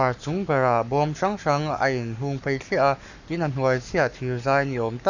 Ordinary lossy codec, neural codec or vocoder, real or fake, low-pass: none; codec, 44.1 kHz, 7.8 kbps, Pupu-Codec; fake; 7.2 kHz